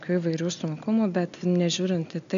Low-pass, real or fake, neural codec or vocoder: 7.2 kHz; real; none